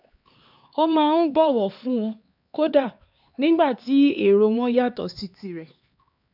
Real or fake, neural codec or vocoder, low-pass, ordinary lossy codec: fake; codec, 16 kHz, 4 kbps, X-Codec, HuBERT features, trained on LibriSpeech; 5.4 kHz; none